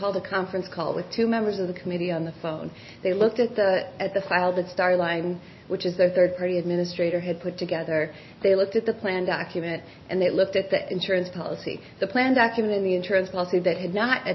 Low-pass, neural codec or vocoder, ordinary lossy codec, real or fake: 7.2 kHz; none; MP3, 24 kbps; real